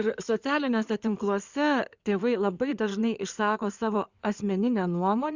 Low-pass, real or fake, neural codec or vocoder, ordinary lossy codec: 7.2 kHz; fake; codec, 16 kHz in and 24 kHz out, 2.2 kbps, FireRedTTS-2 codec; Opus, 64 kbps